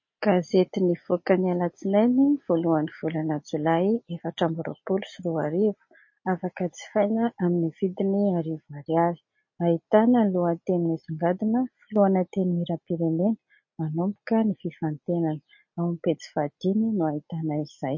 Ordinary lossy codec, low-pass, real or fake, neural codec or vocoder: MP3, 32 kbps; 7.2 kHz; real; none